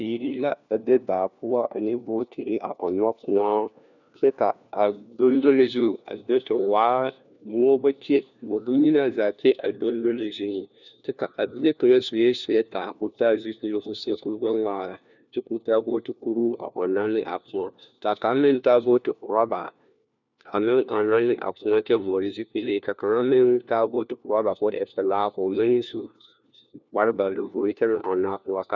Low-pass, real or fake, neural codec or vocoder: 7.2 kHz; fake; codec, 16 kHz, 1 kbps, FunCodec, trained on LibriTTS, 50 frames a second